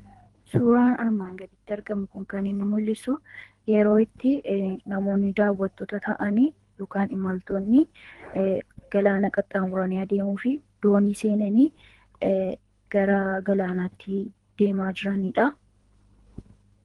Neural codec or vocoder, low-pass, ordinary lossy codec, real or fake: codec, 24 kHz, 3 kbps, HILCodec; 10.8 kHz; Opus, 24 kbps; fake